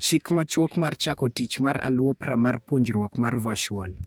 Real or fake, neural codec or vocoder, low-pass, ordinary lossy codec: fake; codec, 44.1 kHz, 2.6 kbps, DAC; none; none